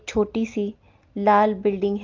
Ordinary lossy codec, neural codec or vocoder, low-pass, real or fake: Opus, 24 kbps; none; 7.2 kHz; real